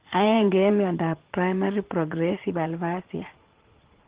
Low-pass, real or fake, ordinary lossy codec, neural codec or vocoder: 3.6 kHz; fake; Opus, 16 kbps; vocoder, 44.1 kHz, 80 mel bands, Vocos